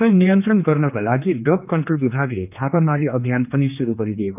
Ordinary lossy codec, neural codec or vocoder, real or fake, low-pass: none; codec, 16 kHz, 2 kbps, X-Codec, HuBERT features, trained on general audio; fake; 3.6 kHz